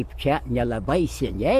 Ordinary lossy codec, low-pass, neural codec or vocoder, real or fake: MP3, 64 kbps; 14.4 kHz; none; real